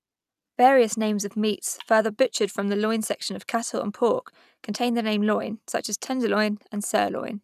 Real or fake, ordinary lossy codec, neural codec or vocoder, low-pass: real; none; none; 14.4 kHz